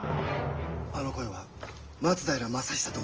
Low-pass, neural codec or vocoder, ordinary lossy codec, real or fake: 7.2 kHz; none; Opus, 24 kbps; real